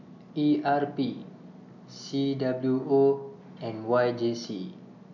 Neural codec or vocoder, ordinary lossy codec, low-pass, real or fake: none; none; 7.2 kHz; real